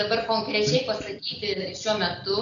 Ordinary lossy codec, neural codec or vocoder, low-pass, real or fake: MP3, 64 kbps; none; 7.2 kHz; real